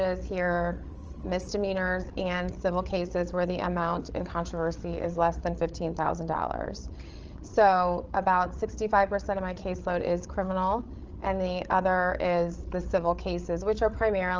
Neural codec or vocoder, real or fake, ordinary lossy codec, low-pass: codec, 16 kHz, 8 kbps, FreqCodec, larger model; fake; Opus, 24 kbps; 7.2 kHz